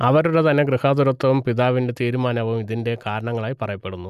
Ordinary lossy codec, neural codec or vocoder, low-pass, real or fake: none; none; 14.4 kHz; real